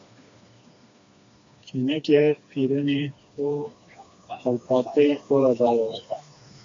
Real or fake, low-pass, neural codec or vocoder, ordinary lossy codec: fake; 7.2 kHz; codec, 16 kHz, 2 kbps, FreqCodec, smaller model; AAC, 48 kbps